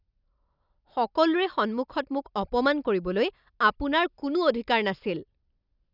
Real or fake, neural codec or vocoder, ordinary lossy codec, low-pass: real; none; none; 5.4 kHz